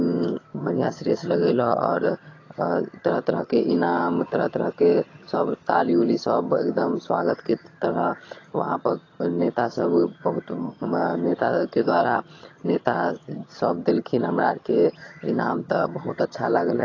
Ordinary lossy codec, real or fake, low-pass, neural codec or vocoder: AAC, 32 kbps; fake; 7.2 kHz; vocoder, 22.05 kHz, 80 mel bands, HiFi-GAN